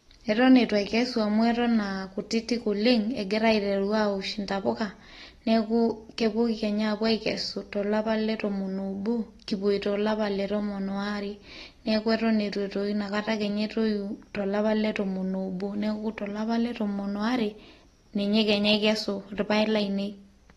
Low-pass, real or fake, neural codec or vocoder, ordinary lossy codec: 19.8 kHz; real; none; AAC, 32 kbps